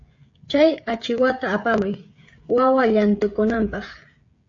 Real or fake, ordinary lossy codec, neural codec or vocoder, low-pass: fake; AAC, 48 kbps; codec, 16 kHz, 16 kbps, FreqCodec, smaller model; 7.2 kHz